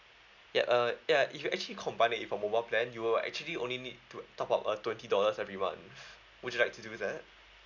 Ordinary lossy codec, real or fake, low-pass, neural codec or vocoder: none; real; 7.2 kHz; none